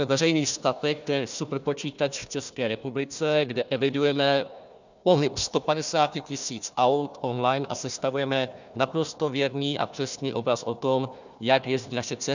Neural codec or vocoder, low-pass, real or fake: codec, 16 kHz, 1 kbps, FunCodec, trained on Chinese and English, 50 frames a second; 7.2 kHz; fake